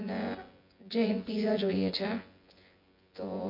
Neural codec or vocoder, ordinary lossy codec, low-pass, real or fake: vocoder, 24 kHz, 100 mel bands, Vocos; MP3, 32 kbps; 5.4 kHz; fake